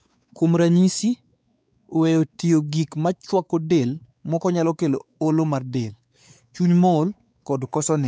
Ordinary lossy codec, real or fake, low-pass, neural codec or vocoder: none; fake; none; codec, 16 kHz, 4 kbps, X-Codec, WavLM features, trained on Multilingual LibriSpeech